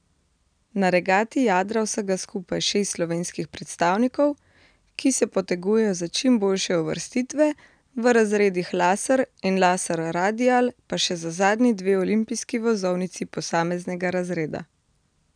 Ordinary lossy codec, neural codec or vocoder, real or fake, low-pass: none; none; real; 9.9 kHz